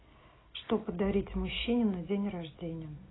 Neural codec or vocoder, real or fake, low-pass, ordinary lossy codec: none; real; 7.2 kHz; AAC, 16 kbps